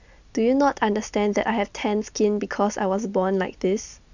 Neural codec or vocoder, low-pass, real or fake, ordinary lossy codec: none; 7.2 kHz; real; none